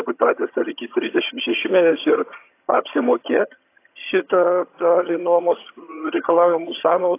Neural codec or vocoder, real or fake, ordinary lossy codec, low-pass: vocoder, 22.05 kHz, 80 mel bands, HiFi-GAN; fake; AAC, 24 kbps; 3.6 kHz